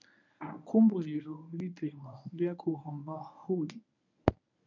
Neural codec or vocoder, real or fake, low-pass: codec, 24 kHz, 0.9 kbps, WavTokenizer, medium speech release version 1; fake; 7.2 kHz